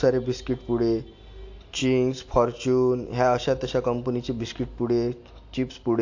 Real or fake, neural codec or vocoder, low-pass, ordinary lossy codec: real; none; 7.2 kHz; AAC, 48 kbps